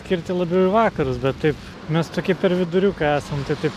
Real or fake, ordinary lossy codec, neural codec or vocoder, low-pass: real; MP3, 96 kbps; none; 14.4 kHz